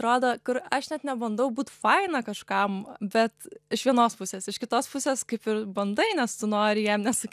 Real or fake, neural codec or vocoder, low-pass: real; none; 14.4 kHz